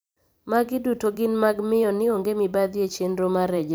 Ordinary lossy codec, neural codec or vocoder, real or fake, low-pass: none; vocoder, 44.1 kHz, 128 mel bands every 512 samples, BigVGAN v2; fake; none